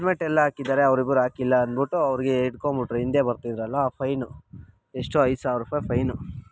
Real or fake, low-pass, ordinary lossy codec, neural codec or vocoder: real; none; none; none